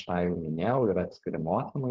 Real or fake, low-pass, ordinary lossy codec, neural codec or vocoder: fake; 7.2 kHz; Opus, 16 kbps; codec, 16 kHz, 4.8 kbps, FACodec